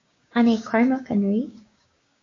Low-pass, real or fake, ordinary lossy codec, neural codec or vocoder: 7.2 kHz; fake; AAC, 32 kbps; codec, 16 kHz, 6 kbps, DAC